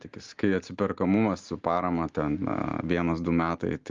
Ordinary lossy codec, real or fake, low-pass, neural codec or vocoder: Opus, 32 kbps; real; 7.2 kHz; none